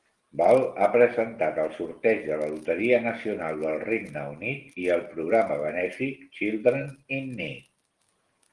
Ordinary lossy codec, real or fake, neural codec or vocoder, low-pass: Opus, 24 kbps; real; none; 10.8 kHz